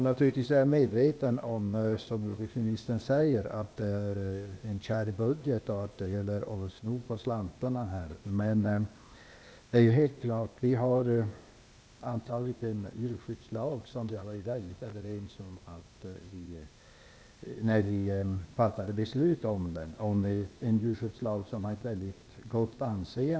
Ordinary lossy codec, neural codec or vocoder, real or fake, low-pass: none; codec, 16 kHz, 0.8 kbps, ZipCodec; fake; none